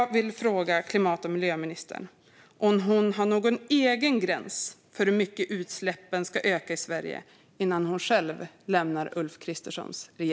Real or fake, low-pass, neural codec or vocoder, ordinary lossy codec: real; none; none; none